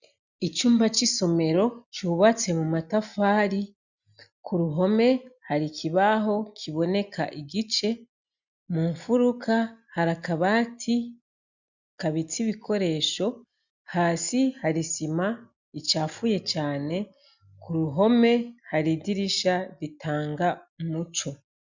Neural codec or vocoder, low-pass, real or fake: none; 7.2 kHz; real